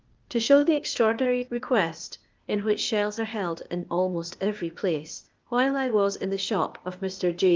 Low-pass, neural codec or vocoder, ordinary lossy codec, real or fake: 7.2 kHz; codec, 16 kHz, 0.8 kbps, ZipCodec; Opus, 24 kbps; fake